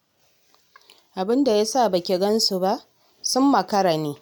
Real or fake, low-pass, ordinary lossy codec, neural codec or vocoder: real; none; none; none